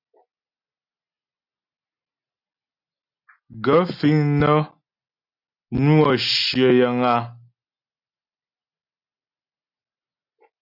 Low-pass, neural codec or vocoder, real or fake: 5.4 kHz; none; real